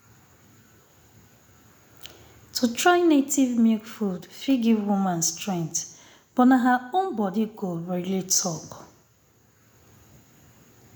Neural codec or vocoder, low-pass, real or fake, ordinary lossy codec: none; none; real; none